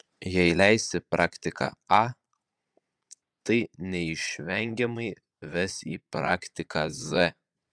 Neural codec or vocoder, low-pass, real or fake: vocoder, 22.05 kHz, 80 mel bands, Vocos; 9.9 kHz; fake